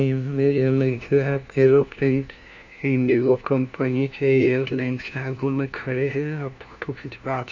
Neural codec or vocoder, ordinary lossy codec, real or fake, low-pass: codec, 16 kHz, 1 kbps, FunCodec, trained on LibriTTS, 50 frames a second; none; fake; 7.2 kHz